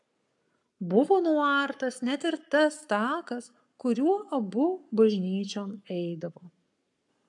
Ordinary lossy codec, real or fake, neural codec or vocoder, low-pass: AAC, 64 kbps; fake; codec, 44.1 kHz, 7.8 kbps, Pupu-Codec; 10.8 kHz